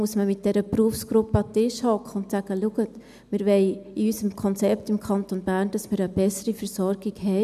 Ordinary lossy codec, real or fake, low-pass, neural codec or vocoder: none; real; 14.4 kHz; none